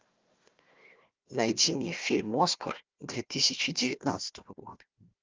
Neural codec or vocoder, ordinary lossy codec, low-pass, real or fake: codec, 16 kHz, 1 kbps, FunCodec, trained on Chinese and English, 50 frames a second; Opus, 24 kbps; 7.2 kHz; fake